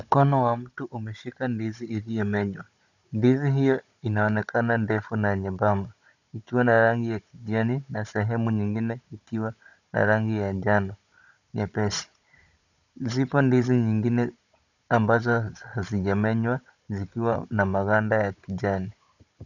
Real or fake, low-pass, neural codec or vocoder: fake; 7.2 kHz; codec, 16 kHz, 16 kbps, FunCodec, trained on Chinese and English, 50 frames a second